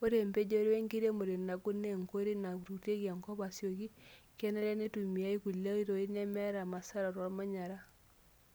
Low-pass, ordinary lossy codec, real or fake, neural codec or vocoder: none; none; real; none